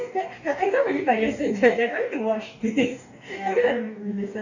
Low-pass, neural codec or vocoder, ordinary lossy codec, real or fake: 7.2 kHz; codec, 44.1 kHz, 2.6 kbps, DAC; none; fake